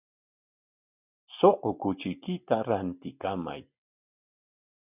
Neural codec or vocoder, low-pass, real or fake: none; 3.6 kHz; real